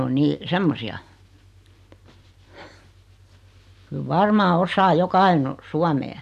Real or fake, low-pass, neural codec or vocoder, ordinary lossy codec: real; 14.4 kHz; none; none